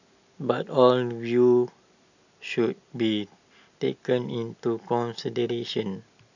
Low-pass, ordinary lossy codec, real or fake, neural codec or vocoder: 7.2 kHz; none; real; none